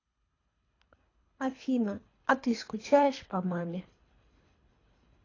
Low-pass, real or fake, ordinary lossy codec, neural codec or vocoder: 7.2 kHz; fake; AAC, 32 kbps; codec, 24 kHz, 3 kbps, HILCodec